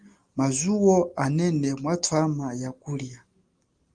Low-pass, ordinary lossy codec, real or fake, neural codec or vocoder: 9.9 kHz; Opus, 24 kbps; real; none